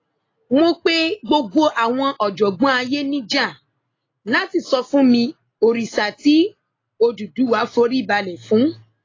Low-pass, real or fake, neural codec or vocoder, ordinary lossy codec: 7.2 kHz; real; none; AAC, 32 kbps